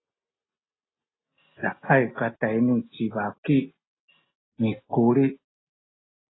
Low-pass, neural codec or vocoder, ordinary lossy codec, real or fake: 7.2 kHz; none; AAC, 16 kbps; real